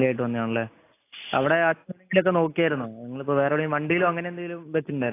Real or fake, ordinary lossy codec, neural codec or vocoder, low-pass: real; AAC, 24 kbps; none; 3.6 kHz